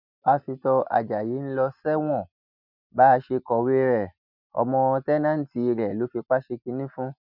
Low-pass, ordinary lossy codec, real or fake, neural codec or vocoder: 5.4 kHz; none; real; none